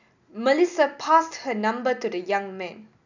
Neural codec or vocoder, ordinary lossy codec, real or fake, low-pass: none; none; real; 7.2 kHz